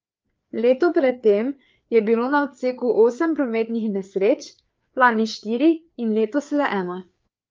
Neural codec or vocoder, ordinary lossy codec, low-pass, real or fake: codec, 16 kHz, 4 kbps, FreqCodec, larger model; Opus, 24 kbps; 7.2 kHz; fake